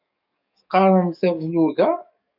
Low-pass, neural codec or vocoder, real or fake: 5.4 kHz; codec, 44.1 kHz, 7.8 kbps, DAC; fake